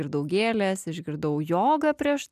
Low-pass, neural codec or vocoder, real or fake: 14.4 kHz; none; real